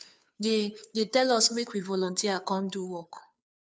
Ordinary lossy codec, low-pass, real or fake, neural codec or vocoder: none; none; fake; codec, 16 kHz, 2 kbps, FunCodec, trained on Chinese and English, 25 frames a second